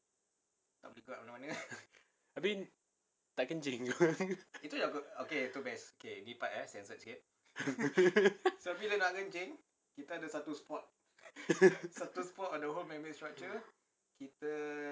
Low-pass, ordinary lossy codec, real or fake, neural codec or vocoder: none; none; real; none